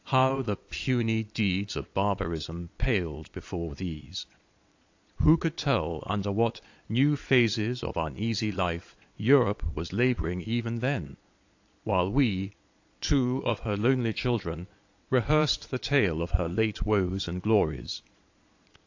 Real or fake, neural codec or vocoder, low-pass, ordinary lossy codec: fake; vocoder, 22.05 kHz, 80 mel bands, Vocos; 7.2 kHz; AAC, 48 kbps